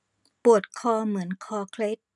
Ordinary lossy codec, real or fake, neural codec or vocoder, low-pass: none; real; none; 10.8 kHz